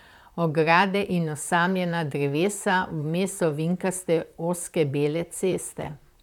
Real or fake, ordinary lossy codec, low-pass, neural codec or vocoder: fake; none; 19.8 kHz; vocoder, 44.1 kHz, 128 mel bands, Pupu-Vocoder